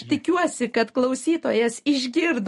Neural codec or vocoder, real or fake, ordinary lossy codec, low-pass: none; real; MP3, 48 kbps; 14.4 kHz